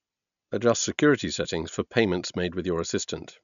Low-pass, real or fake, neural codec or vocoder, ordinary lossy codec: 7.2 kHz; real; none; none